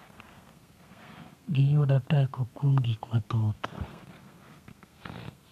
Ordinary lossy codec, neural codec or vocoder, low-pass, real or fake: MP3, 96 kbps; codec, 32 kHz, 1.9 kbps, SNAC; 14.4 kHz; fake